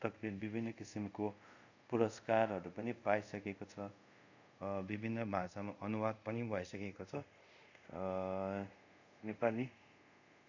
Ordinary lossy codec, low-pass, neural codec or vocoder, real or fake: none; 7.2 kHz; codec, 24 kHz, 0.5 kbps, DualCodec; fake